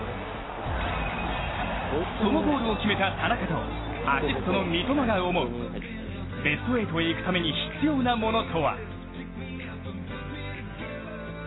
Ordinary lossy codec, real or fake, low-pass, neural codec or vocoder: AAC, 16 kbps; real; 7.2 kHz; none